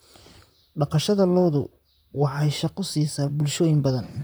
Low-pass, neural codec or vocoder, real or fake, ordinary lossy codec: none; vocoder, 44.1 kHz, 128 mel bands, Pupu-Vocoder; fake; none